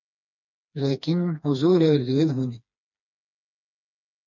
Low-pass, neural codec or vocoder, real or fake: 7.2 kHz; codec, 16 kHz, 2 kbps, FreqCodec, smaller model; fake